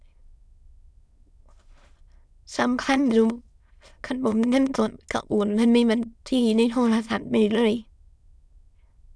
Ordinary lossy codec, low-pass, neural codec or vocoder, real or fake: none; none; autoencoder, 22.05 kHz, a latent of 192 numbers a frame, VITS, trained on many speakers; fake